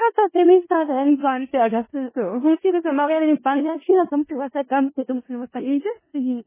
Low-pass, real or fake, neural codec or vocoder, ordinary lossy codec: 3.6 kHz; fake; codec, 16 kHz in and 24 kHz out, 0.4 kbps, LongCat-Audio-Codec, four codebook decoder; MP3, 16 kbps